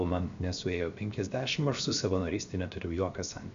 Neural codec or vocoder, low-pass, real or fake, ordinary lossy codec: codec, 16 kHz, 0.7 kbps, FocalCodec; 7.2 kHz; fake; MP3, 64 kbps